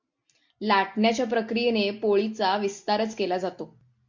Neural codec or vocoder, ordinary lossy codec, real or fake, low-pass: none; MP3, 48 kbps; real; 7.2 kHz